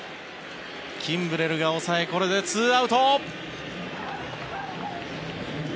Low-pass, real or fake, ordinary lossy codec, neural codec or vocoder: none; real; none; none